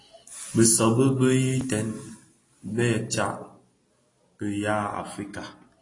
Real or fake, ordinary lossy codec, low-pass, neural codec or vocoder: real; AAC, 32 kbps; 10.8 kHz; none